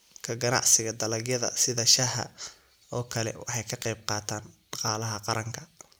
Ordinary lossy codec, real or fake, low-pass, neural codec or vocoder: none; real; none; none